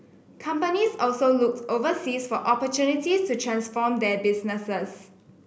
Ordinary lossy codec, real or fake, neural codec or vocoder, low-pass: none; real; none; none